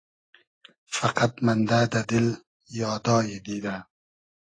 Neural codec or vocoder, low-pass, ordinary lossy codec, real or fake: none; 9.9 kHz; AAC, 32 kbps; real